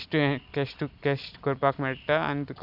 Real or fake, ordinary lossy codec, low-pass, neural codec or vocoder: real; none; 5.4 kHz; none